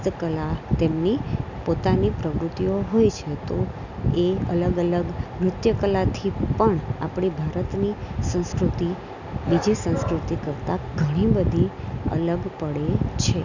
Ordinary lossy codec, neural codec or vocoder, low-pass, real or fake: none; none; 7.2 kHz; real